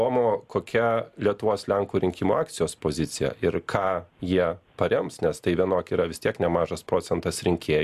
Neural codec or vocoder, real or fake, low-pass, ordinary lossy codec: none; real; 14.4 kHz; MP3, 96 kbps